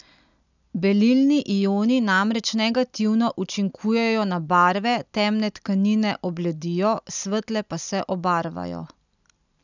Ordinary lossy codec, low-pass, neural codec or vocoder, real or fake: none; 7.2 kHz; none; real